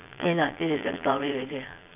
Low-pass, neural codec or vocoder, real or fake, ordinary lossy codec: 3.6 kHz; vocoder, 22.05 kHz, 80 mel bands, Vocos; fake; none